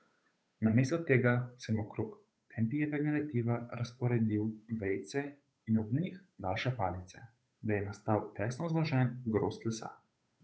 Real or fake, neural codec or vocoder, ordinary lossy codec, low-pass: fake; codec, 16 kHz, 8 kbps, FunCodec, trained on Chinese and English, 25 frames a second; none; none